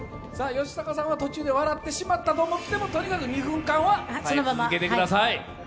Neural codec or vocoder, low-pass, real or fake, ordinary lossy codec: none; none; real; none